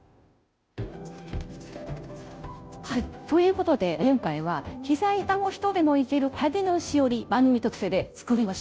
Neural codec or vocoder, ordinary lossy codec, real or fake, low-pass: codec, 16 kHz, 0.5 kbps, FunCodec, trained on Chinese and English, 25 frames a second; none; fake; none